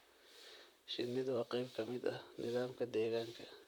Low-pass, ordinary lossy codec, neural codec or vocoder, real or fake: 19.8 kHz; none; vocoder, 44.1 kHz, 128 mel bands, Pupu-Vocoder; fake